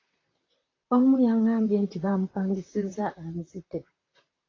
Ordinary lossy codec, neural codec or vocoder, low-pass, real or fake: AAC, 32 kbps; vocoder, 44.1 kHz, 128 mel bands, Pupu-Vocoder; 7.2 kHz; fake